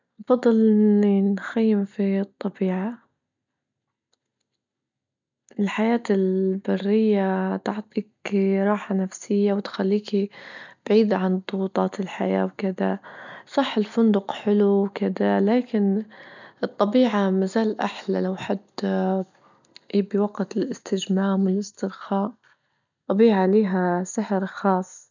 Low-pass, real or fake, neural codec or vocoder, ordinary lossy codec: 7.2 kHz; real; none; none